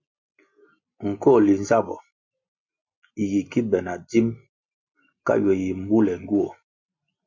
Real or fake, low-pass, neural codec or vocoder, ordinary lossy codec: real; 7.2 kHz; none; MP3, 48 kbps